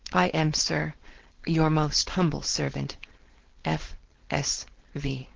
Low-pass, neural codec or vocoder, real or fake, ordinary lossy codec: 7.2 kHz; codec, 16 kHz, 4.8 kbps, FACodec; fake; Opus, 16 kbps